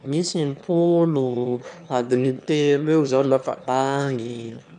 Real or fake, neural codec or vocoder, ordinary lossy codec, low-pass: fake; autoencoder, 22.05 kHz, a latent of 192 numbers a frame, VITS, trained on one speaker; none; 9.9 kHz